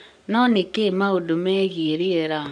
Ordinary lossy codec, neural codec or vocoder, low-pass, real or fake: none; codec, 44.1 kHz, 7.8 kbps, Pupu-Codec; 9.9 kHz; fake